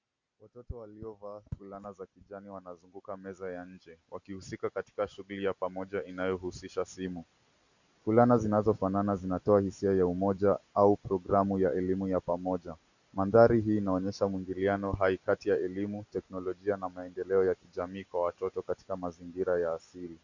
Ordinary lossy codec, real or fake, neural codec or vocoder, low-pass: Opus, 64 kbps; real; none; 7.2 kHz